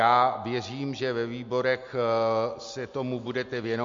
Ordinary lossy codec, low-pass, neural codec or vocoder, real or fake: MP3, 48 kbps; 7.2 kHz; none; real